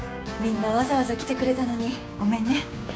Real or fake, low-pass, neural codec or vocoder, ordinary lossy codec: fake; none; codec, 16 kHz, 6 kbps, DAC; none